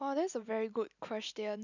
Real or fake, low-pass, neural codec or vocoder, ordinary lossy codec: real; 7.2 kHz; none; none